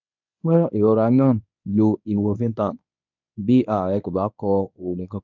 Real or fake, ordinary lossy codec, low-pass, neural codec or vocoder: fake; none; 7.2 kHz; codec, 24 kHz, 0.9 kbps, WavTokenizer, medium speech release version 1